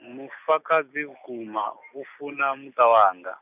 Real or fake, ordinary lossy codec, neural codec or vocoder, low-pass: real; none; none; 3.6 kHz